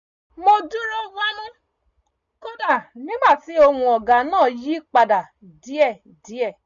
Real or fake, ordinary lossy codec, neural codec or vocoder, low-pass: real; none; none; 7.2 kHz